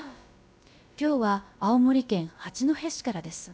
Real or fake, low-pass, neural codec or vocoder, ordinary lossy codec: fake; none; codec, 16 kHz, about 1 kbps, DyCAST, with the encoder's durations; none